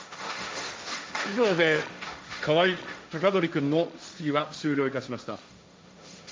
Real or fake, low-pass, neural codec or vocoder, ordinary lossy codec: fake; none; codec, 16 kHz, 1.1 kbps, Voila-Tokenizer; none